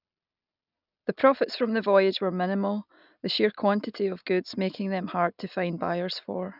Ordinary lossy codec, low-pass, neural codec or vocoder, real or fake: none; 5.4 kHz; none; real